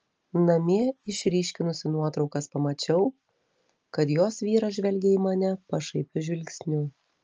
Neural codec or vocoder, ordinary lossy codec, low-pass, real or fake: none; Opus, 24 kbps; 7.2 kHz; real